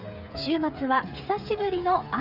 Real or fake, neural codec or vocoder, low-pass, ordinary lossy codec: fake; codec, 16 kHz, 8 kbps, FreqCodec, smaller model; 5.4 kHz; AAC, 48 kbps